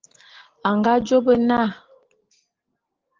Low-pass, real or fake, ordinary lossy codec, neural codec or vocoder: 7.2 kHz; real; Opus, 16 kbps; none